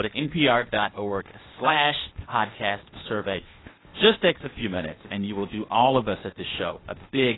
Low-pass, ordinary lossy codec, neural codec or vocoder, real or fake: 7.2 kHz; AAC, 16 kbps; codec, 16 kHz, 0.8 kbps, ZipCodec; fake